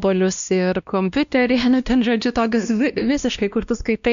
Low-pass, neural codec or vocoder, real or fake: 7.2 kHz; codec, 16 kHz, 1 kbps, X-Codec, WavLM features, trained on Multilingual LibriSpeech; fake